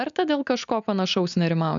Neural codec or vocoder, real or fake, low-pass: none; real; 7.2 kHz